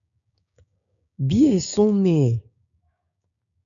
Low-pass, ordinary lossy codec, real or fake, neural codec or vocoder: 7.2 kHz; MP3, 96 kbps; fake; codec, 16 kHz, 6 kbps, DAC